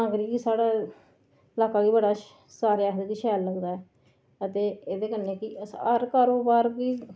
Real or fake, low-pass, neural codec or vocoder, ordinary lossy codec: real; none; none; none